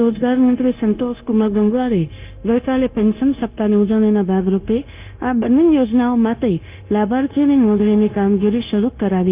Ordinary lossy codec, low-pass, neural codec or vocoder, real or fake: Opus, 24 kbps; 3.6 kHz; codec, 16 kHz, 0.9 kbps, LongCat-Audio-Codec; fake